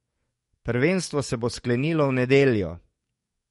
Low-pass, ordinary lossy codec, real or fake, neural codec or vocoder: 19.8 kHz; MP3, 48 kbps; fake; codec, 44.1 kHz, 7.8 kbps, DAC